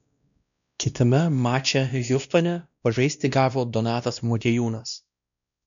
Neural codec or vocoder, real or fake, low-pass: codec, 16 kHz, 1 kbps, X-Codec, WavLM features, trained on Multilingual LibriSpeech; fake; 7.2 kHz